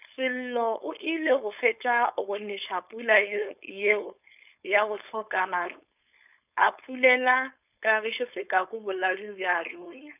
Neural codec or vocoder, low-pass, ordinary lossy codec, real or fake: codec, 16 kHz, 4.8 kbps, FACodec; 3.6 kHz; none; fake